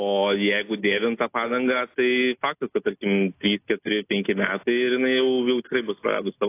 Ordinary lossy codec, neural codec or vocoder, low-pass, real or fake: AAC, 24 kbps; none; 3.6 kHz; real